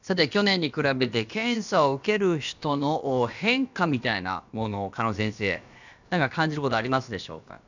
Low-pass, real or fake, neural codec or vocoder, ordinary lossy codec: 7.2 kHz; fake; codec, 16 kHz, about 1 kbps, DyCAST, with the encoder's durations; none